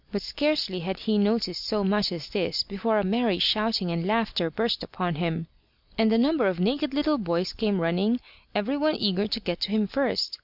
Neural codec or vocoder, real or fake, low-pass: none; real; 5.4 kHz